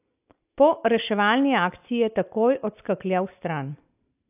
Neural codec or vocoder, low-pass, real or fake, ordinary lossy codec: none; 3.6 kHz; real; AAC, 32 kbps